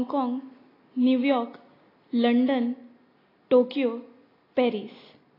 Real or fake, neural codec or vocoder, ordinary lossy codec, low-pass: real; none; AAC, 24 kbps; 5.4 kHz